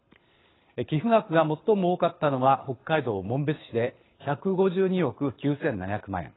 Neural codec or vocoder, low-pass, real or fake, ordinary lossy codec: codec, 24 kHz, 6 kbps, HILCodec; 7.2 kHz; fake; AAC, 16 kbps